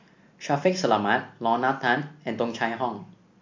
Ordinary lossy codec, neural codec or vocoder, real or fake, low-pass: MP3, 48 kbps; none; real; 7.2 kHz